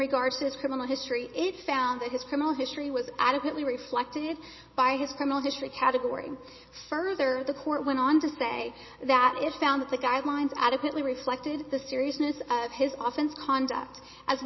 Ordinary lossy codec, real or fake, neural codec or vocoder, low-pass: MP3, 24 kbps; real; none; 7.2 kHz